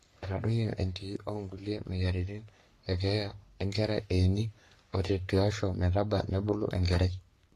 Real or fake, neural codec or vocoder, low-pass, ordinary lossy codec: fake; codec, 44.1 kHz, 3.4 kbps, Pupu-Codec; 14.4 kHz; AAC, 48 kbps